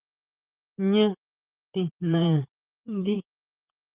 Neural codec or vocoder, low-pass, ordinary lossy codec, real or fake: vocoder, 44.1 kHz, 128 mel bands, Pupu-Vocoder; 3.6 kHz; Opus, 32 kbps; fake